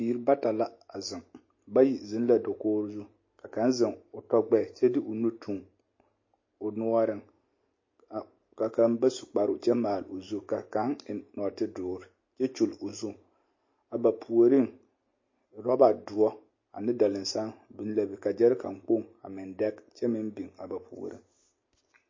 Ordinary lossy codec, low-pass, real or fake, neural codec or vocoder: MP3, 32 kbps; 7.2 kHz; real; none